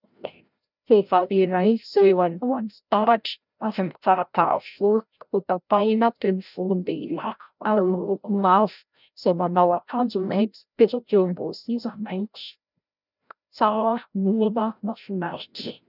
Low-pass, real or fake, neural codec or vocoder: 5.4 kHz; fake; codec, 16 kHz, 0.5 kbps, FreqCodec, larger model